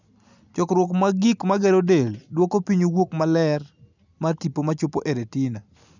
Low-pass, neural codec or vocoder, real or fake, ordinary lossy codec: 7.2 kHz; none; real; none